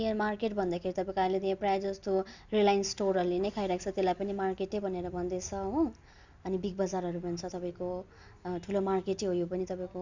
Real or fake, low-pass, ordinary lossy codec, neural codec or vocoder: real; 7.2 kHz; Opus, 64 kbps; none